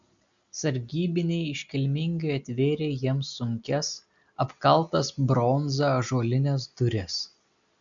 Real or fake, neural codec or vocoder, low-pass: real; none; 7.2 kHz